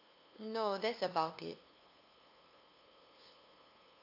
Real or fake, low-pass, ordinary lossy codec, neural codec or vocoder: fake; 5.4 kHz; AAC, 32 kbps; codec, 16 kHz, 2 kbps, FunCodec, trained on LibriTTS, 25 frames a second